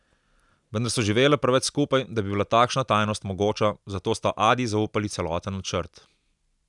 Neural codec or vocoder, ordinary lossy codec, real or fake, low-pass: none; none; real; 10.8 kHz